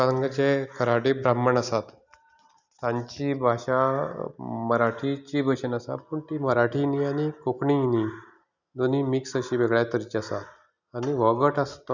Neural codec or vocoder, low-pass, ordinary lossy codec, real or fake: none; 7.2 kHz; none; real